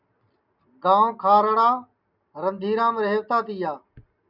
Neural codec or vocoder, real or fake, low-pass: none; real; 5.4 kHz